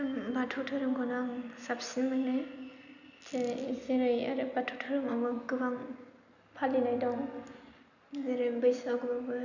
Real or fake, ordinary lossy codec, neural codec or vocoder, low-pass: real; none; none; 7.2 kHz